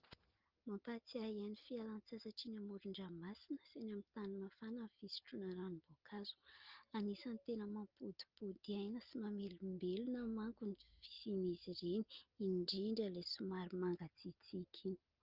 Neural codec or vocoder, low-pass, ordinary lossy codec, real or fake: none; 5.4 kHz; Opus, 24 kbps; real